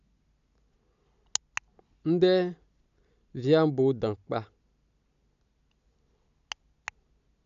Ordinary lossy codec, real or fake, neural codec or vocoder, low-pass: none; real; none; 7.2 kHz